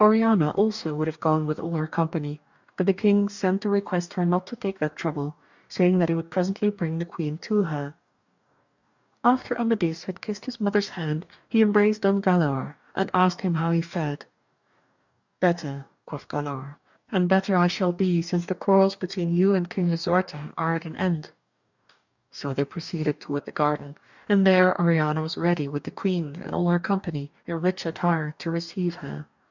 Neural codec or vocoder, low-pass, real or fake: codec, 44.1 kHz, 2.6 kbps, DAC; 7.2 kHz; fake